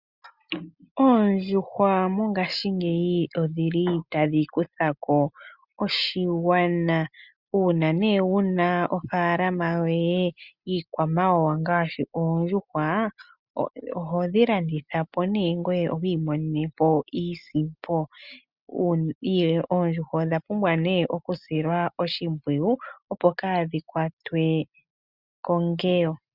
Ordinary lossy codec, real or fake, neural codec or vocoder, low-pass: Opus, 64 kbps; real; none; 5.4 kHz